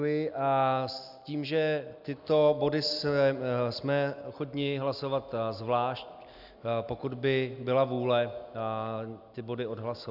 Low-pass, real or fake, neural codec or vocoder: 5.4 kHz; real; none